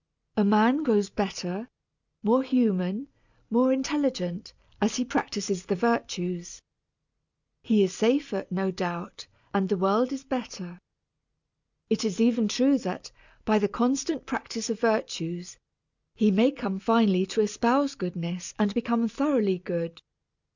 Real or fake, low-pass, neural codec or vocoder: real; 7.2 kHz; none